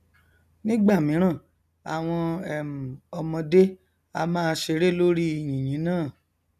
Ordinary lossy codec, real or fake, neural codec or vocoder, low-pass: none; real; none; 14.4 kHz